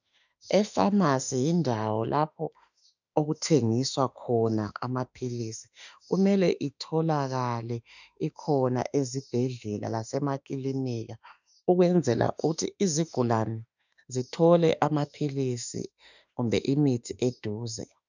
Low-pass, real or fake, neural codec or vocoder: 7.2 kHz; fake; autoencoder, 48 kHz, 32 numbers a frame, DAC-VAE, trained on Japanese speech